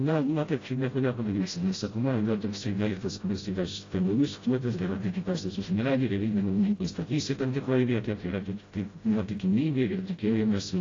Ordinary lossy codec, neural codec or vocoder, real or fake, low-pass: AAC, 32 kbps; codec, 16 kHz, 0.5 kbps, FreqCodec, smaller model; fake; 7.2 kHz